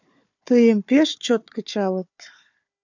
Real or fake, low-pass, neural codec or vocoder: fake; 7.2 kHz; codec, 16 kHz, 4 kbps, FunCodec, trained on Chinese and English, 50 frames a second